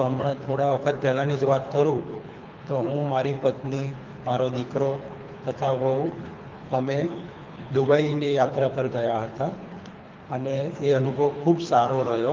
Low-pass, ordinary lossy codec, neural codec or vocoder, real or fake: 7.2 kHz; Opus, 24 kbps; codec, 24 kHz, 3 kbps, HILCodec; fake